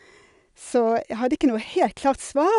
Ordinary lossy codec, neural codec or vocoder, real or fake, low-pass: none; none; real; 10.8 kHz